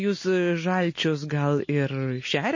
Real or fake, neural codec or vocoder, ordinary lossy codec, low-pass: real; none; MP3, 32 kbps; 7.2 kHz